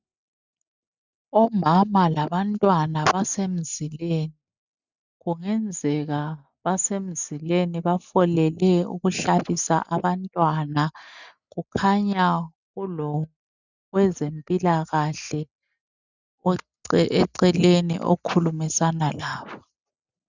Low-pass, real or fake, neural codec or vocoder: 7.2 kHz; real; none